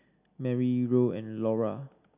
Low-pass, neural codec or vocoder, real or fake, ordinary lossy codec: 3.6 kHz; none; real; none